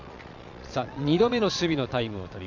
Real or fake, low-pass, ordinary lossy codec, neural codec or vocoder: fake; 7.2 kHz; none; vocoder, 22.05 kHz, 80 mel bands, Vocos